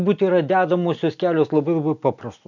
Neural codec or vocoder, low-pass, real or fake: none; 7.2 kHz; real